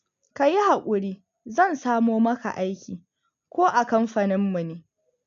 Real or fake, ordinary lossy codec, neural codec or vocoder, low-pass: real; MP3, 64 kbps; none; 7.2 kHz